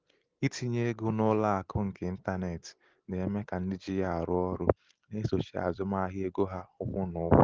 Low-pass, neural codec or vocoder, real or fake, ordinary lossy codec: 7.2 kHz; none; real; Opus, 16 kbps